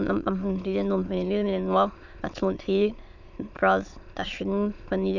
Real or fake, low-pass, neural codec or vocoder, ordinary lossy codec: fake; 7.2 kHz; autoencoder, 22.05 kHz, a latent of 192 numbers a frame, VITS, trained on many speakers; Opus, 64 kbps